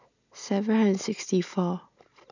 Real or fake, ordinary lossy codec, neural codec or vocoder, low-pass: real; none; none; 7.2 kHz